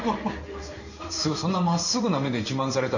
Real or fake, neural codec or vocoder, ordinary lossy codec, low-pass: fake; codec, 16 kHz in and 24 kHz out, 1 kbps, XY-Tokenizer; none; 7.2 kHz